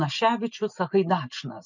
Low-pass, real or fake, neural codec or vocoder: 7.2 kHz; real; none